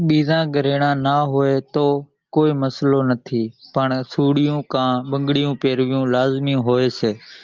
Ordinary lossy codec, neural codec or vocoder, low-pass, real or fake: Opus, 32 kbps; none; 7.2 kHz; real